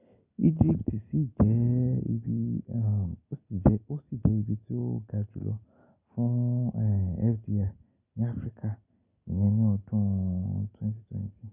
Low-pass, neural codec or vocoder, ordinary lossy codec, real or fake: 3.6 kHz; none; none; real